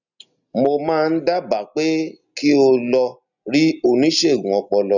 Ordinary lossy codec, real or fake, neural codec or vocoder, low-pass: none; real; none; 7.2 kHz